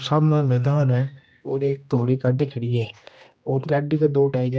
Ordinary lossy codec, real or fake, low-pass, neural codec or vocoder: none; fake; none; codec, 16 kHz, 1 kbps, X-Codec, HuBERT features, trained on general audio